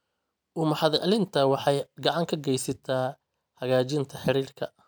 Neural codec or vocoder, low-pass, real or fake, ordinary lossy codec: none; none; real; none